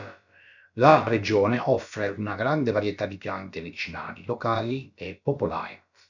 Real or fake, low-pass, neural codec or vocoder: fake; 7.2 kHz; codec, 16 kHz, about 1 kbps, DyCAST, with the encoder's durations